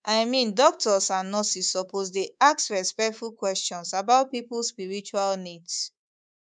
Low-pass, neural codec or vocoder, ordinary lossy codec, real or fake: 9.9 kHz; codec, 24 kHz, 3.1 kbps, DualCodec; none; fake